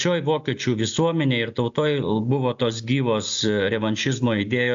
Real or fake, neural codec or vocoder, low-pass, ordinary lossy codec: real; none; 7.2 kHz; AAC, 64 kbps